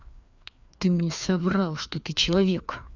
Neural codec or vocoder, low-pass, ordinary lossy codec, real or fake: codec, 16 kHz, 2 kbps, FreqCodec, larger model; 7.2 kHz; none; fake